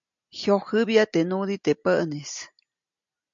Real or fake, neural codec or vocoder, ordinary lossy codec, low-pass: real; none; MP3, 64 kbps; 7.2 kHz